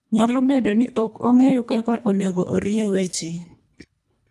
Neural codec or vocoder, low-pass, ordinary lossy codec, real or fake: codec, 24 kHz, 1.5 kbps, HILCodec; none; none; fake